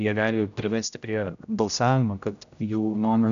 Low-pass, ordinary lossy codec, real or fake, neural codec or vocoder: 7.2 kHz; AAC, 96 kbps; fake; codec, 16 kHz, 0.5 kbps, X-Codec, HuBERT features, trained on general audio